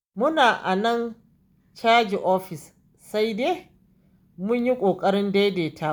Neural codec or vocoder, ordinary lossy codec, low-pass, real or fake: none; none; none; real